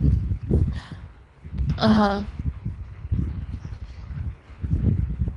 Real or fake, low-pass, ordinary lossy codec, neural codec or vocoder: fake; 10.8 kHz; none; codec, 24 kHz, 3 kbps, HILCodec